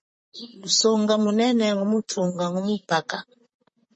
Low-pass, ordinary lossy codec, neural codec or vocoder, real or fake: 10.8 kHz; MP3, 32 kbps; vocoder, 44.1 kHz, 128 mel bands, Pupu-Vocoder; fake